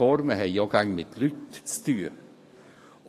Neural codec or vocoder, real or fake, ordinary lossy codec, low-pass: codec, 44.1 kHz, 7.8 kbps, DAC; fake; AAC, 48 kbps; 14.4 kHz